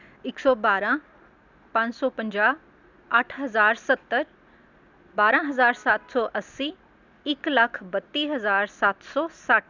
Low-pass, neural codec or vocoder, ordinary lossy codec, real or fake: 7.2 kHz; none; none; real